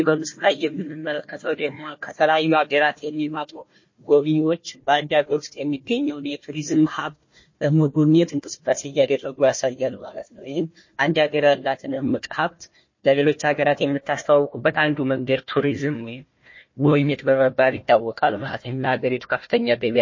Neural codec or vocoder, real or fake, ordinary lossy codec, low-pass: codec, 16 kHz, 1 kbps, FunCodec, trained on Chinese and English, 50 frames a second; fake; MP3, 32 kbps; 7.2 kHz